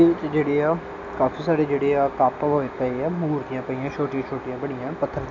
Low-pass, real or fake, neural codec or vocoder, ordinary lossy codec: 7.2 kHz; real; none; none